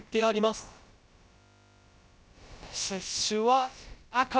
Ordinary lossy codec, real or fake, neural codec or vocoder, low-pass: none; fake; codec, 16 kHz, about 1 kbps, DyCAST, with the encoder's durations; none